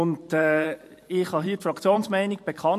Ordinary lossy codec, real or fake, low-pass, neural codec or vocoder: MP3, 64 kbps; fake; 14.4 kHz; vocoder, 44.1 kHz, 128 mel bands every 512 samples, BigVGAN v2